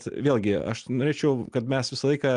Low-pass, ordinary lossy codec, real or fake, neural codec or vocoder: 9.9 kHz; Opus, 32 kbps; real; none